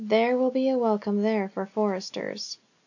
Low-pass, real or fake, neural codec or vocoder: 7.2 kHz; real; none